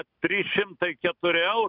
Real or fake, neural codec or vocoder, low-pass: real; none; 5.4 kHz